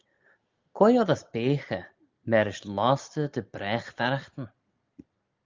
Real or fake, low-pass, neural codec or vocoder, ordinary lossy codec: real; 7.2 kHz; none; Opus, 32 kbps